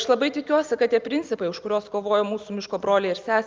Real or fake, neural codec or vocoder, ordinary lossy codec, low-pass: real; none; Opus, 24 kbps; 7.2 kHz